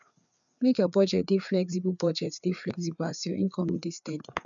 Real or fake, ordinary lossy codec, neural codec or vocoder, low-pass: fake; none; codec, 16 kHz, 4 kbps, FreqCodec, larger model; 7.2 kHz